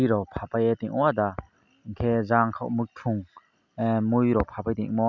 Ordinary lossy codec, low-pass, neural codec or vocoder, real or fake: none; 7.2 kHz; none; real